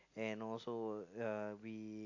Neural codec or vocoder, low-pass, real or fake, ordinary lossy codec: none; 7.2 kHz; real; AAC, 48 kbps